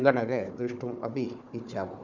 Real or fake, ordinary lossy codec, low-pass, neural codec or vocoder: fake; none; 7.2 kHz; codec, 16 kHz, 4 kbps, FunCodec, trained on Chinese and English, 50 frames a second